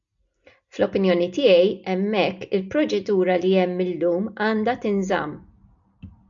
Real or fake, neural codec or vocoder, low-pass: real; none; 7.2 kHz